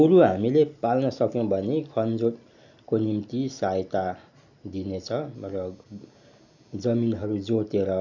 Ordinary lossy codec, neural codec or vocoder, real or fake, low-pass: none; none; real; 7.2 kHz